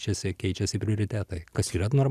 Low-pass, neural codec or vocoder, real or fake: 14.4 kHz; none; real